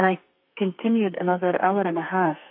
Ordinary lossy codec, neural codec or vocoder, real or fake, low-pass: MP3, 32 kbps; codec, 32 kHz, 1.9 kbps, SNAC; fake; 5.4 kHz